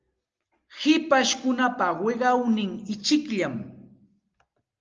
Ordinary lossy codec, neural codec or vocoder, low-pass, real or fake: Opus, 24 kbps; none; 7.2 kHz; real